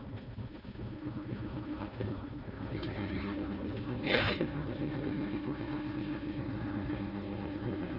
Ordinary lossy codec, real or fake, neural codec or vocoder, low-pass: none; fake; codec, 16 kHz, 1 kbps, FunCodec, trained on Chinese and English, 50 frames a second; 5.4 kHz